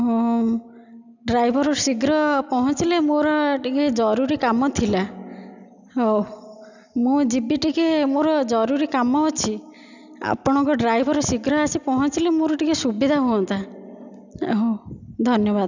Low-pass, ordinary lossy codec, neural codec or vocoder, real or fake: 7.2 kHz; none; none; real